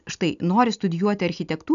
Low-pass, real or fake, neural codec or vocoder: 7.2 kHz; real; none